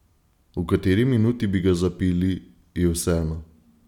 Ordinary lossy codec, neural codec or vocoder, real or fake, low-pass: none; none; real; 19.8 kHz